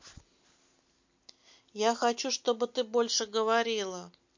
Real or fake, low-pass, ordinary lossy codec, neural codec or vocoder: real; 7.2 kHz; MP3, 48 kbps; none